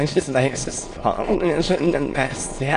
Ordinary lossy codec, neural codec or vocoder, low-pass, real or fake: AAC, 48 kbps; autoencoder, 22.05 kHz, a latent of 192 numbers a frame, VITS, trained on many speakers; 9.9 kHz; fake